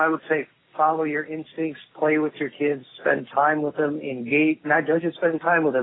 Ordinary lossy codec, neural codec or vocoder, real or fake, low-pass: AAC, 16 kbps; none; real; 7.2 kHz